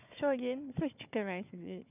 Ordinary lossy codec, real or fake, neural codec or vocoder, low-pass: none; fake; codec, 16 kHz, 4 kbps, FunCodec, trained on Chinese and English, 50 frames a second; 3.6 kHz